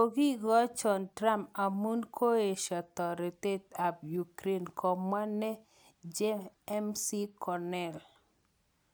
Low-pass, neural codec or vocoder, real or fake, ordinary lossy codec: none; none; real; none